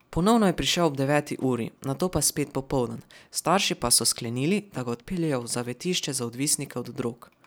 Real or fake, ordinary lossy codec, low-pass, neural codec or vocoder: real; none; none; none